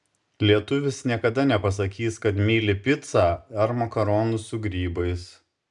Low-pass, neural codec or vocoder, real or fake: 10.8 kHz; none; real